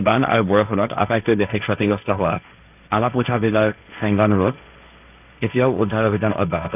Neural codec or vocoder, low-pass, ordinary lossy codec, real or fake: codec, 16 kHz, 1.1 kbps, Voila-Tokenizer; 3.6 kHz; none; fake